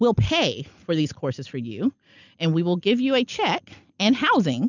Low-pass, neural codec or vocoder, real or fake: 7.2 kHz; none; real